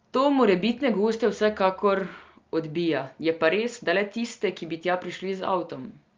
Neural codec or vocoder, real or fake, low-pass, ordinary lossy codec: none; real; 7.2 kHz; Opus, 32 kbps